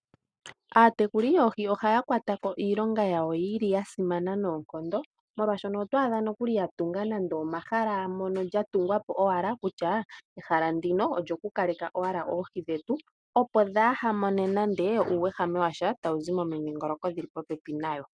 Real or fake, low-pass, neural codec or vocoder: real; 9.9 kHz; none